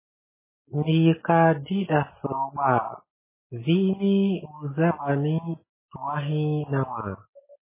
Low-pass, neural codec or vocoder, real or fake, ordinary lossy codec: 3.6 kHz; none; real; MP3, 16 kbps